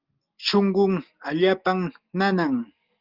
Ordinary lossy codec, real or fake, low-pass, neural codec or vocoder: Opus, 32 kbps; real; 5.4 kHz; none